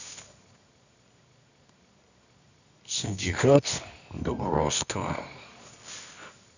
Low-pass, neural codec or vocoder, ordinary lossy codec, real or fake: 7.2 kHz; codec, 24 kHz, 0.9 kbps, WavTokenizer, medium music audio release; none; fake